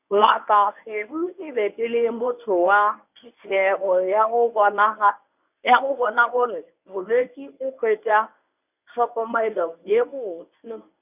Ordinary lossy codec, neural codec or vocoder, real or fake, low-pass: none; codec, 24 kHz, 0.9 kbps, WavTokenizer, medium speech release version 1; fake; 3.6 kHz